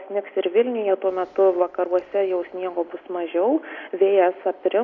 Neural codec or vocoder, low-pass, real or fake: none; 7.2 kHz; real